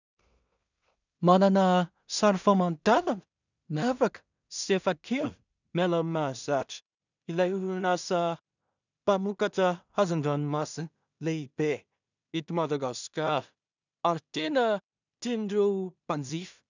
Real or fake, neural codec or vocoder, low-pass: fake; codec, 16 kHz in and 24 kHz out, 0.4 kbps, LongCat-Audio-Codec, two codebook decoder; 7.2 kHz